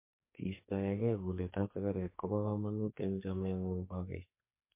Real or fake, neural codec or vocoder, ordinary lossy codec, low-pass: fake; codec, 44.1 kHz, 2.6 kbps, SNAC; MP3, 24 kbps; 3.6 kHz